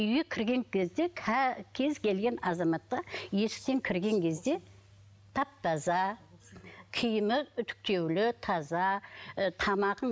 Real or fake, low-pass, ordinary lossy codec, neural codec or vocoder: real; none; none; none